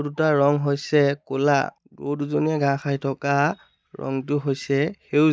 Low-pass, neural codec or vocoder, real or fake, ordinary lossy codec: none; none; real; none